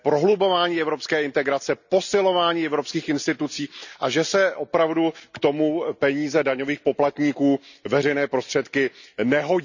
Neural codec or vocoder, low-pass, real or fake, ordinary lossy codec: none; 7.2 kHz; real; none